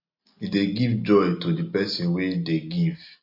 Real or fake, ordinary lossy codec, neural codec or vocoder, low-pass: real; MP3, 32 kbps; none; 5.4 kHz